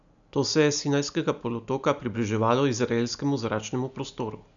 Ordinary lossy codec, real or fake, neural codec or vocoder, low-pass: none; real; none; 7.2 kHz